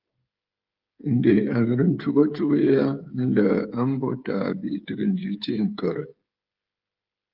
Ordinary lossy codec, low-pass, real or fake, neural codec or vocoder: Opus, 32 kbps; 5.4 kHz; fake; codec, 16 kHz, 8 kbps, FreqCodec, smaller model